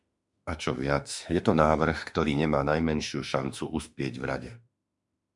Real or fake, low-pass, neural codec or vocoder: fake; 10.8 kHz; autoencoder, 48 kHz, 32 numbers a frame, DAC-VAE, trained on Japanese speech